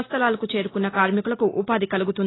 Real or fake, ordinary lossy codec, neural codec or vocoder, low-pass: real; AAC, 16 kbps; none; 7.2 kHz